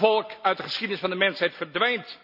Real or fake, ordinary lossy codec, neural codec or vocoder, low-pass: real; none; none; 5.4 kHz